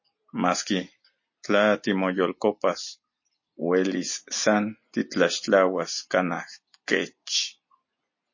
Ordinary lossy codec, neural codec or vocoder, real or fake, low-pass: MP3, 32 kbps; none; real; 7.2 kHz